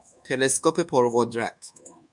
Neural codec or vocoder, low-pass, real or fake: codec, 24 kHz, 1.2 kbps, DualCodec; 10.8 kHz; fake